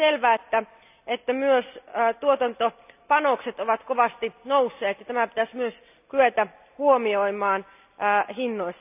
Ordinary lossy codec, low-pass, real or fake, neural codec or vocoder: none; 3.6 kHz; real; none